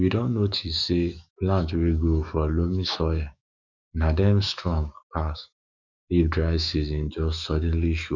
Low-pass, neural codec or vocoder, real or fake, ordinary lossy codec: 7.2 kHz; autoencoder, 48 kHz, 128 numbers a frame, DAC-VAE, trained on Japanese speech; fake; none